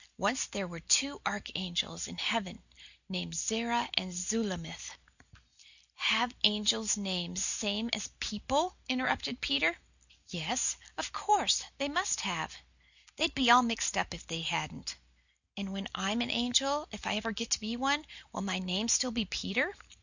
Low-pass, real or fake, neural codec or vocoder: 7.2 kHz; real; none